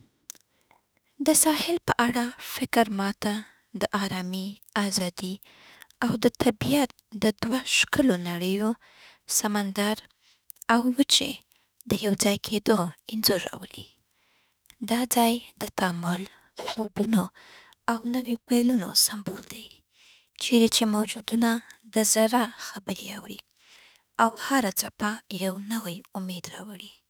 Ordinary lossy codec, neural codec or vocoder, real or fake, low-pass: none; autoencoder, 48 kHz, 32 numbers a frame, DAC-VAE, trained on Japanese speech; fake; none